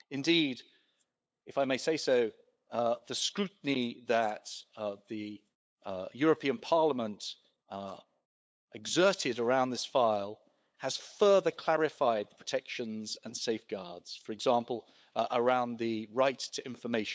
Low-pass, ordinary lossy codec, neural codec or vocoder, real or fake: none; none; codec, 16 kHz, 8 kbps, FunCodec, trained on LibriTTS, 25 frames a second; fake